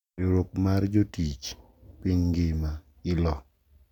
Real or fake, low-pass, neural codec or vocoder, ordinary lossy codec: real; 19.8 kHz; none; none